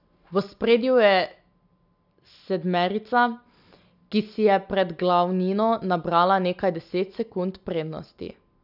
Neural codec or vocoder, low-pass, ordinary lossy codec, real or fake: none; 5.4 kHz; none; real